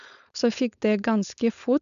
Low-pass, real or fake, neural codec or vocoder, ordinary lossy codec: 7.2 kHz; fake; codec, 16 kHz, 4.8 kbps, FACodec; none